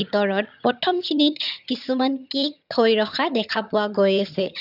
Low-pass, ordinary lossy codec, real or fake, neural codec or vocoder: 5.4 kHz; none; fake; vocoder, 22.05 kHz, 80 mel bands, HiFi-GAN